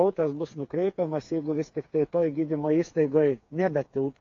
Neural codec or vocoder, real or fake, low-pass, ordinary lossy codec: codec, 16 kHz, 4 kbps, FreqCodec, smaller model; fake; 7.2 kHz; AAC, 48 kbps